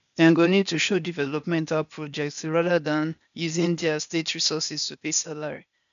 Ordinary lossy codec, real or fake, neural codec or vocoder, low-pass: none; fake; codec, 16 kHz, 0.8 kbps, ZipCodec; 7.2 kHz